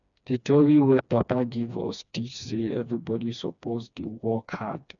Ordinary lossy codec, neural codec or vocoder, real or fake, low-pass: none; codec, 16 kHz, 2 kbps, FreqCodec, smaller model; fake; 7.2 kHz